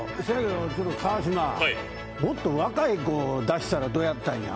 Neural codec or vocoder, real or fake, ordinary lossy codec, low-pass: none; real; none; none